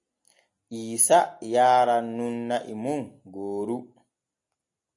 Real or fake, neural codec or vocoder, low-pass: real; none; 10.8 kHz